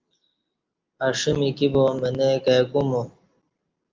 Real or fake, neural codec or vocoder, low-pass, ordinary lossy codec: real; none; 7.2 kHz; Opus, 32 kbps